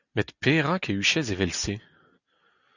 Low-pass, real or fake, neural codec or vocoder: 7.2 kHz; real; none